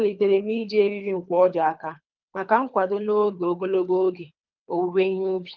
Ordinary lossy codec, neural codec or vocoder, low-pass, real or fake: Opus, 24 kbps; codec, 24 kHz, 3 kbps, HILCodec; 7.2 kHz; fake